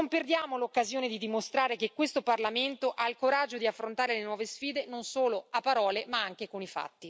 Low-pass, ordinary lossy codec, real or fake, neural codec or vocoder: none; none; real; none